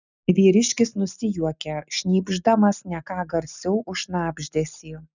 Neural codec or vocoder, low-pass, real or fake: none; 7.2 kHz; real